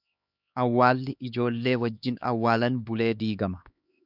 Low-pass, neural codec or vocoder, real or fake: 5.4 kHz; codec, 16 kHz, 2 kbps, X-Codec, HuBERT features, trained on LibriSpeech; fake